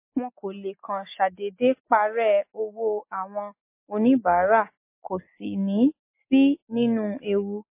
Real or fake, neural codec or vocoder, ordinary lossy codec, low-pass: real; none; MP3, 24 kbps; 3.6 kHz